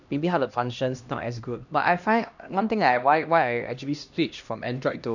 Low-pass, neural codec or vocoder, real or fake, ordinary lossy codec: 7.2 kHz; codec, 16 kHz, 1 kbps, X-Codec, HuBERT features, trained on LibriSpeech; fake; none